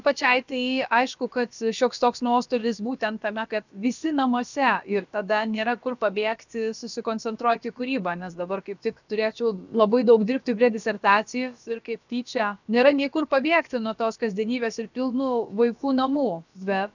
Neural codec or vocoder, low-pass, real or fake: codec, 16 kHz, about 1 kbps, DyCAST, with the encoder's durations; 7.2 kHz; fake